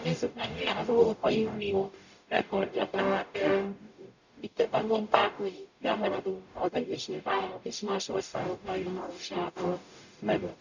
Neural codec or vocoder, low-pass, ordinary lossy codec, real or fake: codec, 44.1 kHz, 0.9 kbps, DAC; 7.2 kHz; none; fake